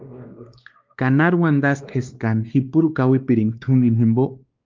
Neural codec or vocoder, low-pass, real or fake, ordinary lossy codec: codec, 16 kHz, 2 kbps, X-Codec, WavLM features, trained on Multilingual LibriSpeech; 7.2 kHz; fake; Opus, 24 kbps